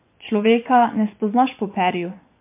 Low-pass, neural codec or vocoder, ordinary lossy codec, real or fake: 3.6 kHz; vocoder, 24 kHz, 100 mel bands, Vocos; MP3, 32 kbps; fake